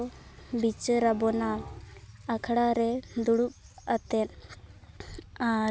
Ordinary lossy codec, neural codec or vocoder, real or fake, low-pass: none; none; real; none